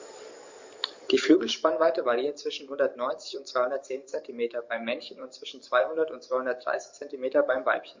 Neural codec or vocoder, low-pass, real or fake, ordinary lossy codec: codec, 44.1 kHz, 7.8 kbps, DAC; 7.2 kHz; fake; MP3, 48 kbps